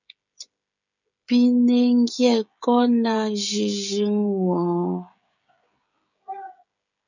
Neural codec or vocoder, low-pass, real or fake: codec, 16 kHz, 16 kbps, FreqCodec, smaller model; 7.2 kHz; fake